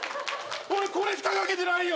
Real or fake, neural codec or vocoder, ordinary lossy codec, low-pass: real; none; none; none